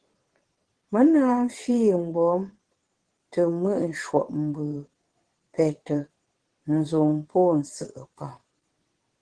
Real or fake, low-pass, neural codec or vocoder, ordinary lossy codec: fake; 9.9 kHz; vocoder, 22.05 kHz, 80 mel bands, WaveNeXt; Opus, 16 kbps